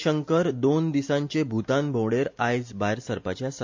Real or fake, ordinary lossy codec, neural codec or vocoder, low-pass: real; MP3, 48 kbps; none; 7.2 kHz